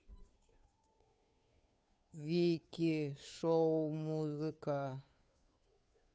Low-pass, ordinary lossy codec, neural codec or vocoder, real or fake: none; none; codec, 16 kHz, 2 kbps, FunCodec, trained on Chinese and English, 25 frames a second; fake